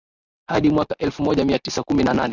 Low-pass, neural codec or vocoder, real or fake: 7.2 kHz; none; real